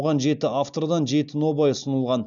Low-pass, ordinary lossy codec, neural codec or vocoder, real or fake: 7.2 kHz; none; none; real